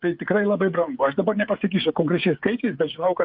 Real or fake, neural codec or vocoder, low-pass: fake; codec, 16 kHz, 8 kbps, FreqCodec, smaller model; 5.4 kHz